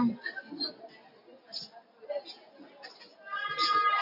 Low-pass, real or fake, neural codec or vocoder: 5.4 kHz; real; none